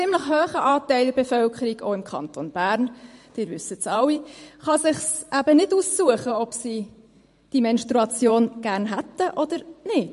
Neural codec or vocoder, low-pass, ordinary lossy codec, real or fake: vocoder, 44.1 kHz, 128 mel bands every 512 samples, BigVGAN v2; 14.4 kHz; MP3, 48 kbps; fake